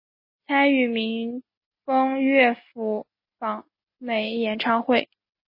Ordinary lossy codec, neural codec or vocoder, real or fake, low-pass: MP3, 24 kbps; none; real; 5.4 kHz